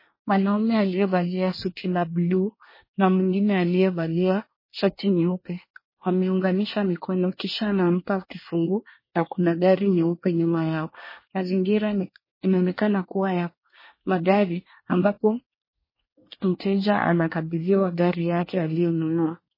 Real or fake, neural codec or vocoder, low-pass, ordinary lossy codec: fake; codec, 24 kHz, 1 kbps, SNAC; 5.4 kHz; MP3, 24 kbps